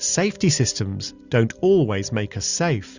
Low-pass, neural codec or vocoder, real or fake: 7.2 kHz; none; real